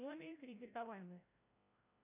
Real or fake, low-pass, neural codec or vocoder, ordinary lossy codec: fake; 3.6 kHz; codec, 16 kHz, 0.5 kbps, FreqCodec, larger model; AAC, 24 kbps